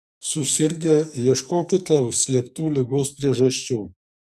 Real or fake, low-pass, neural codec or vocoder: fake; 14.4 kHz; codec, 44.1 kHz, 2.6 kbps, SNAC